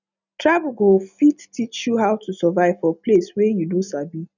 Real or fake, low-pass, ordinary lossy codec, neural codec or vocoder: real; 7.2 kHz; none; none